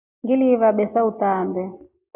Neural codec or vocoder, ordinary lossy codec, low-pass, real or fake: none; AAC, 24 kbps; 3.6 kHz; real